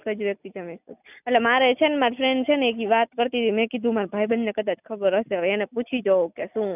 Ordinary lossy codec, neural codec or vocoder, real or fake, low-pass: none; none; real; 3.6 kHz